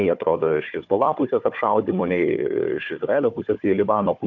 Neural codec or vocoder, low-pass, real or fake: codec, 16 kHz, 4 kbps, FunCodec, trained on Chinese and English, 50 frames a second; 7.2 kHz; fake